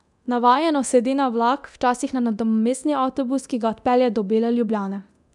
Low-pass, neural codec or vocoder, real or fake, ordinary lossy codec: 10.8 kHz; codec, 24 kHz, 0.9 kbps, DualCodec; fake; none